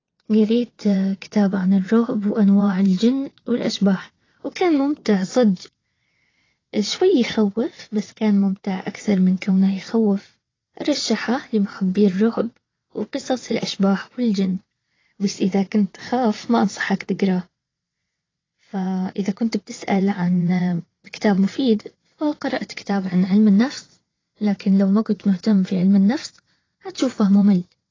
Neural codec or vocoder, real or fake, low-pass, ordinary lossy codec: vocoder, 22.05 kHz, 80 mel bands, WaveNeXt; fake; 7.2 kHz; AAC, 32 kbps